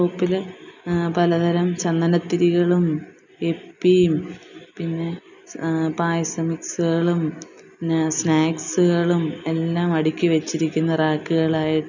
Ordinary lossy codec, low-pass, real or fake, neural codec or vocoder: none; 7.2 kHz; real; none